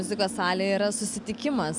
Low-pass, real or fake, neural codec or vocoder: 10.8 kHz; real; none